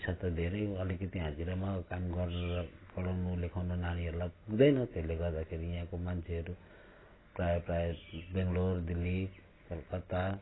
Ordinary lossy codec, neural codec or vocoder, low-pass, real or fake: AAC, 16 kbps; none; 7.2 kHz; real